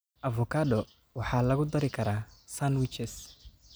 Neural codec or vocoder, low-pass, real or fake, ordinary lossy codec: none; none; real; none